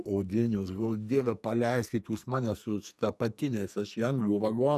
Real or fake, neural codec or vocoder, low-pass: fake; codec, 44.1 kHz, 2.6 kbps, SNAC; 14.4 kHz